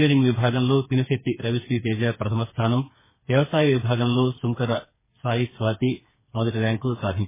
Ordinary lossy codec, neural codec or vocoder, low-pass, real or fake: MP3, 16 kbps; codec, 16 kHz, 4 kbps, FreqCodec, smaller model; 3.6 kHz; fake